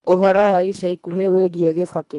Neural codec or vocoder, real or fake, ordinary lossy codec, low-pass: codec, 24 kHz, 1.5 kbps, HILCodec; fake; none; 10.8 kHz